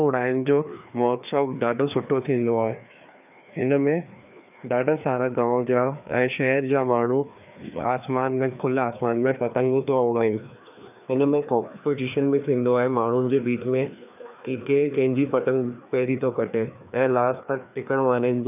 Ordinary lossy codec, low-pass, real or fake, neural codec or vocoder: none; 3.6 kHz; fake; codec, 16 kHz, 2 kbps, FreqCodec, larger model